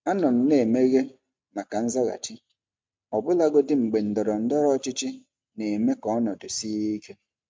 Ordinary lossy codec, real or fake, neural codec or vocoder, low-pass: none; real; none; none